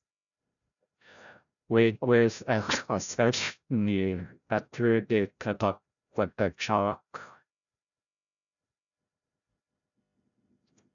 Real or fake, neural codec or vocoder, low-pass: fake; codec, 16 kHz, 0.5 kbps, FreqCodec, larger model; 7.2 kHz